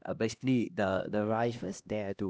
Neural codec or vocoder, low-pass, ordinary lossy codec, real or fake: codec, 16 kHz, 1 kbps, X-Codec, HuBERT features, trained on LibriSpeech; none; none; fake